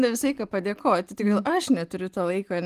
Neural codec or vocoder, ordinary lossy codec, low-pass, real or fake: none; Opus, 24 kbps; 14.4 kHz; real